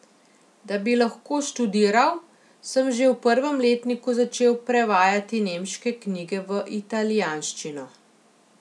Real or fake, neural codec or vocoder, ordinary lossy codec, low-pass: real; none; none; none